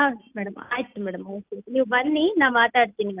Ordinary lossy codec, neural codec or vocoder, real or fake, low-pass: Opus, 24 kbps; none; real; 3.6 kHz